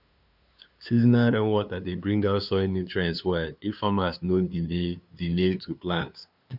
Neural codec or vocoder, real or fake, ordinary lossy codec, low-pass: codec, 16 kHz, 2 kbps, FunCodec, trained on LibriTTS, 25 frames a second; fake; none; 5.4 kHz